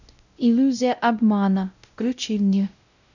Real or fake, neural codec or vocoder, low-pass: fake; codec, 16 kHz, 0.5 kbps, X-Codec, WavLM features, trained on Multilingual LibriSpeech; 7.2 kHz